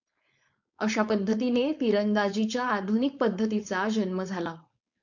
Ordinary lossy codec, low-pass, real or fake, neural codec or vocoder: MP3, 64 kbps; 7.2 kHz; fake; codec, 16 kHz, 4.8 kbps, FACodec